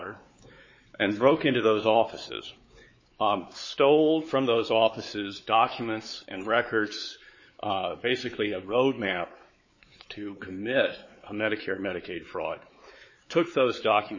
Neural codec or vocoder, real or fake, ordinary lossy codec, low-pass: codec, 16 kHz, 4 kbps, X-Codec, WavLM features, trained on Multilingual LibriSpeech; fake; MP3, 32 kbps; 7.2 kHz